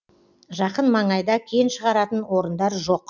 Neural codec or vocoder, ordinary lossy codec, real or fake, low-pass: none; none; real; 7.2 kHz